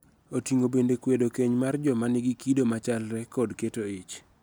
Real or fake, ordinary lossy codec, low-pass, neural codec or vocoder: real; none; none; none